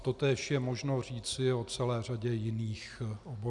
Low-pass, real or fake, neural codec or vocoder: 10.8 kHz; real; none